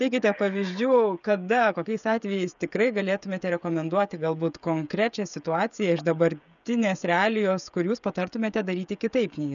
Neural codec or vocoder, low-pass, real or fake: codec, 16 kHz, 16 kbps, FreqCodec, smaller model; 7.2 kHz; fake